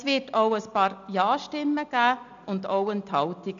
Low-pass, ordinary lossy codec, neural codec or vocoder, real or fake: 7.2 kHz; none; none; real